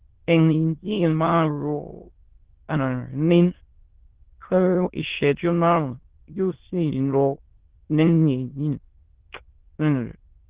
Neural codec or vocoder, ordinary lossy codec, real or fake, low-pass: autoencoder, 22.05 kHz, a latent of 192 numbers a frame, VITS, trained on many speakers; Opus, 16 kbps; fake; 3.6 kHz